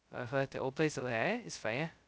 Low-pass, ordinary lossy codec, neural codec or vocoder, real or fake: none; none; codec, 16 kHz, 0.2 kbps, FocalCodec; fake